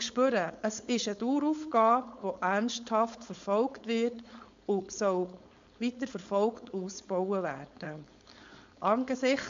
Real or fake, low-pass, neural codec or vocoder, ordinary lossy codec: fake; 7.2 kHz; codec, 16 kHz, 4.8 kbps, FACodec; AAC, 64 kbps